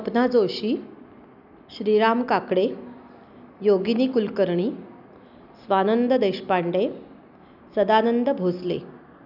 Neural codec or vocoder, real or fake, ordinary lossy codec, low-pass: none; real; none; 5.4 kHz